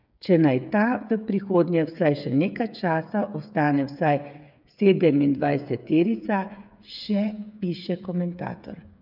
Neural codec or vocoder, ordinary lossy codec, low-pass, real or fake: codec, 16 kHz, 8 kbps, FreqCodec, smaller model; none; 5.4 kHz; fake